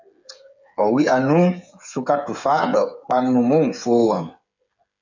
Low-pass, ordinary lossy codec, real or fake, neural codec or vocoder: 7.2 kHz; MP3, 64 kbps; fake; codec, 16 kHz, 8 kbps, FreqCodec, smaller model